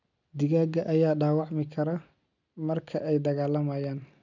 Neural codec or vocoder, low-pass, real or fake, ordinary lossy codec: none; 7.2 kHz; real; none